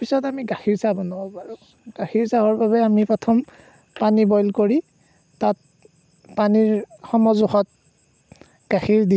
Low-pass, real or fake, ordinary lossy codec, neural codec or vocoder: none; real; none; none